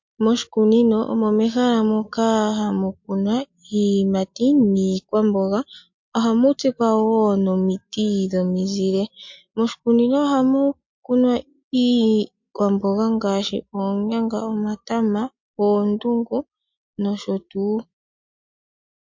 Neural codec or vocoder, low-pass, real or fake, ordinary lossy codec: none; 7.2 kHz; real; MP3, 48 kbps